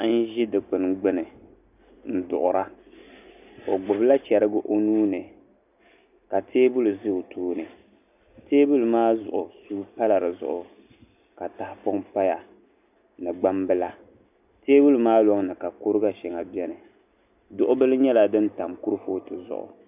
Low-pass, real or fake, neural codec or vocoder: 3.6 kHz; real; none